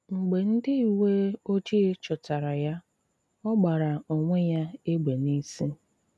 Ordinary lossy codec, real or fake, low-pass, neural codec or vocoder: none; real; none; none